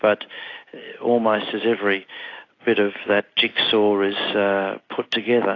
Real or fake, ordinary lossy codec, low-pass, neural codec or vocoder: real; AAC, 32 kbps; 7.2 kHz; none